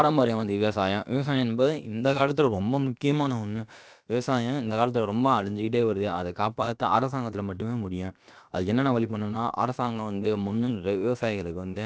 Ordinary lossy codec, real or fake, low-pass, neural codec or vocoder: none; fake; none; codec, 16 kHz, about 1 kbps, DyCAST, with the encoder's durations